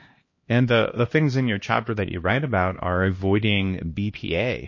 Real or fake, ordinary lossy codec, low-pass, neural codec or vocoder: fake; MP3, 32 kbps; 7.2 kHz; codec, 16 kHz, 1 kbps, X-Codec, HuBERT features, trained on LibriSpeech